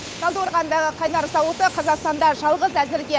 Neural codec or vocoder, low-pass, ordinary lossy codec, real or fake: codec, 16 kHz, 8 kbps, FunCodec, trained on Chinese and English, 25 frames a second; none; none; fake